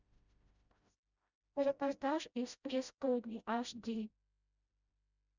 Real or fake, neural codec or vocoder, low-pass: fake; codec, 16 kHz, 0.5 kbps, FreqCodec, smaller model; 7.2 kHz